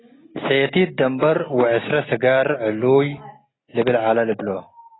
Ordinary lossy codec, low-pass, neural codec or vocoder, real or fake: AAC, 16 kbps; 7.2 kHz; none; real